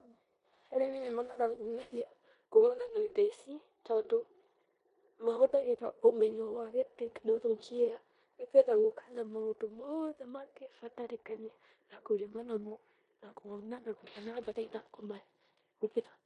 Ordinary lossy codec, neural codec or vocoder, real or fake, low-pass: MP3, 48 kbps; codec, 16 kHz in and 24 kHz out, 0.9 kbps, LongCat-Audio-Codec, four codebook decoder; fake; 10.8 kHz